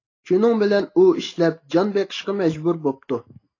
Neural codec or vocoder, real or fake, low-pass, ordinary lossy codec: none; real; 7.2 kHz; AAC, 32 kbps